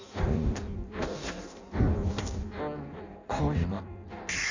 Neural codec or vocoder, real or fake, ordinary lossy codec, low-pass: codec, 16 kHz in and 24 kHz out, 0.6 kbps, FireRedTTS-2 codec; fake; AAC, 48 kbps; 7.2 kHz